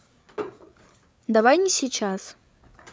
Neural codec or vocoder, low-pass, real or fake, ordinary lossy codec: codec, 16 kHz, 8 kbps, FreqCodec, larger model; none; fake; none